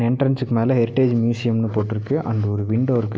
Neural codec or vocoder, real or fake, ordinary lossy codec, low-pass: none; real; none; none